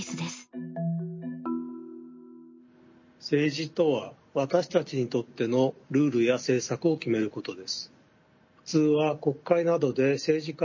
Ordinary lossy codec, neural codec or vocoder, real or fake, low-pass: MP3, 32 kbps; none; real; 7.2 kHz